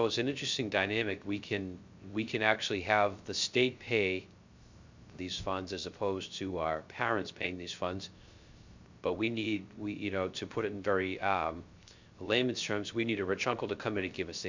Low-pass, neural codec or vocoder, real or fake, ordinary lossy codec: 7.2 kHz; codec, 16 kHz, 0.3 kbps, FocalCodec; fake; MP3, 64 kbps